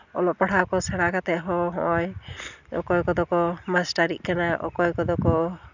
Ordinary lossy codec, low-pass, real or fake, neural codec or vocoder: none; 7.2 kHz; real; none